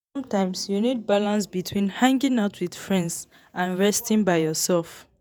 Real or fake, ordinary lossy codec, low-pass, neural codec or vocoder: fake; none; none; vocoder, 48 kHz, 128 mel bands, Vocos